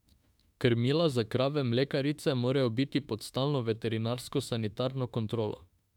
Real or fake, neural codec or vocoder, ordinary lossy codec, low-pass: fake; autoencoder, 48 kHz, 32 numbers a frame, DAC-VAE, trained on Japanese speech; none; 19.8 kHz